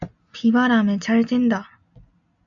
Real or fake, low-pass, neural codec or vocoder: real; 7.2 kHz; none